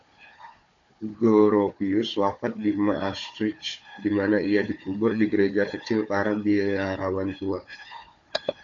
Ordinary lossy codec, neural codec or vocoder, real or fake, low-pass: AAC, 64 kbps; codec, 16 kHz, 4 kbps, FunCodec, trained on Chinese and English, 50 frames a second; fake; 7.2 kHz